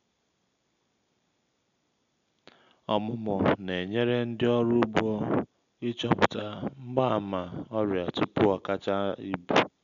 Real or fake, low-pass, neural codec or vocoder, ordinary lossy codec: real; 7.2 kHz; none; none